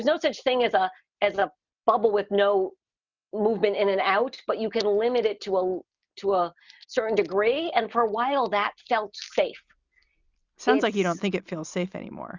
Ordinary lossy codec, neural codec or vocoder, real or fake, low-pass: Opus, 64 kbps; none; real; 7.2 kHz